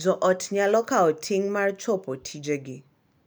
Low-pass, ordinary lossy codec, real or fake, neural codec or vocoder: none; none; real; none